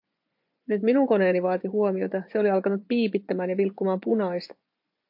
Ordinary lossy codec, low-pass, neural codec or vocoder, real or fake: MP3, 48 kbps; 5.4 kHz; vocoder, 24 kHz, 100 mel bands, Vocos; fake